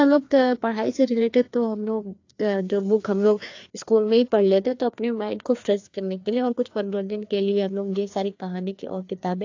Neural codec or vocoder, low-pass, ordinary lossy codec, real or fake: codec, 16 kHz, 2 kbps, FreqCodec, larger model; 7.2 kHz; AAC, 48 kbps; fake